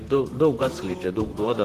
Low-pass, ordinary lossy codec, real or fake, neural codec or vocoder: 14.4 kHz; Opus, 16 kbps; fake; vocoder, 44.1 kHz, 128 mel bands, Pupu-Vocoder